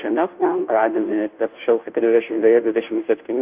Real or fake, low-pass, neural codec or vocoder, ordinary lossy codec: fake; 3.6 kHz; codec, 16 kHz, 0.5 kbps, FunCodec, trained on Chinese and English, 25 frames a second; Opus, 24 kbps